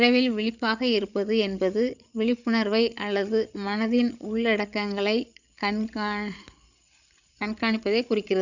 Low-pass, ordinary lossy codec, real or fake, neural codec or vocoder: 7.2 kHz; none; fake; codec, 16 kHz, 8 kbps, FreqCodec, larger model